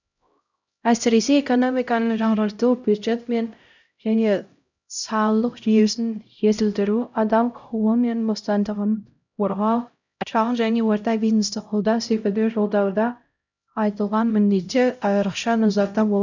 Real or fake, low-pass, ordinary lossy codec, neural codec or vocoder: fake; 7.2 kHz; none; codec, 16 kHz, 0.5 kbps, X-Codec, HuBERT features, trained on LibriSpeech